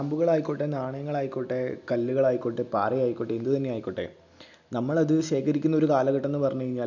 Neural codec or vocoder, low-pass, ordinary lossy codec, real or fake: none; 7.2 kHz; none; real